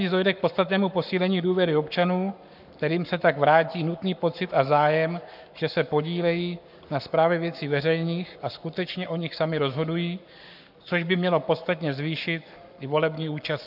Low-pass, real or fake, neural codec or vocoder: 5.4 kHz; fake; codec, 44.1 kHz, 7.8 kbps, Pupu-Codec